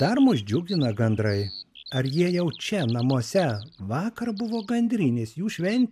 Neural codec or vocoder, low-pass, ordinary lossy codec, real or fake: none; 14.4 kHz; MP3, 96 kbps; real